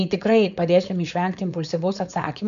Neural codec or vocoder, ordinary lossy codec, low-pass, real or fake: codec, 16 kHz, 4.8 kbps, FACodec; AAC, 96 kbps; 7.2 kHz; fake